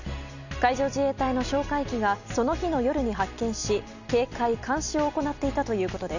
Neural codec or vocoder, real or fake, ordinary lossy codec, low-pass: none; real; none; 7.2 kHz